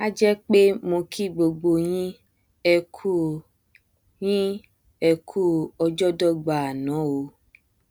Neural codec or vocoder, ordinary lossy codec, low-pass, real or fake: none; none; none; real